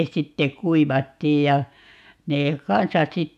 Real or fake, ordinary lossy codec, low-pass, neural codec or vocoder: fake; none; 14.4 kHz; autoencoder, 48 kHz, 128 numbers a frame, DAC-VAE, trained on Japanese speech